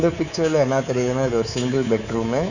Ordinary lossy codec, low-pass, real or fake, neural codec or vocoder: none; 7.2 kHz; fake; codec, 24 kHz, 3.1 kbps, DualCodec